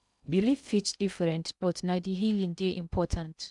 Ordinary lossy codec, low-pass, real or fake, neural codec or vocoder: none; 10.8 kHz; fake; codec, 16 kHz in and 24 kHz out, 0.6 kbps, FocalCodec, streaming, 2048 codes